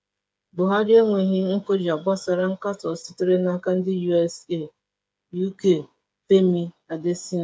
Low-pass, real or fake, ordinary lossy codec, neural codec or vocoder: none; fake; none; codec, 16 kHz, 8 kbps, FreqCodec, smaller model